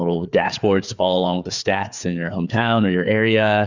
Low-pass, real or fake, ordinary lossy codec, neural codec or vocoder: 7.2 kHz; fake; Opus, 64 kbps; codec, 16 kHz, 4 kbps, FreqCodec, larger model